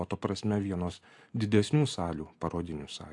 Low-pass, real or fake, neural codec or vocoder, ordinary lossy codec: 10.8 kHz; real; none; AAC, 64 kbps